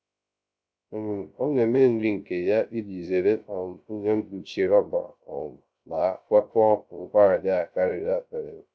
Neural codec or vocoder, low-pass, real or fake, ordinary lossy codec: codec, 16 kHz, 0.3 kbps, FocalCodec; none; fake; none